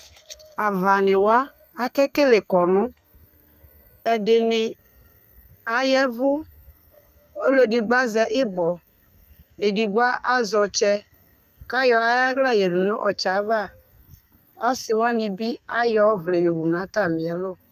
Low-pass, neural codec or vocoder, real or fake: 14.4 kHz; codec, 32 kHz, 1.9 kbps, SNAC; fake